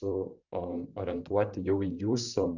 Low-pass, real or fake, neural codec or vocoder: 7.2 kHz; fake; vocoder, 44.1 kHz, 128 mel bands, Pupu-Vocoder